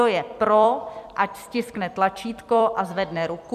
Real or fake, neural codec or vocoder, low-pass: fake; vocoder, 44.1 kHz, 128 mel bands every 256 samples, BigVGAN v2; 14.4 kHz